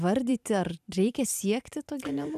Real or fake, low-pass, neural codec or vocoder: fake; 14.4 kHz; vocoder, 44.1 kHz, 128 mel bands every 512 samples, BigVGAN v2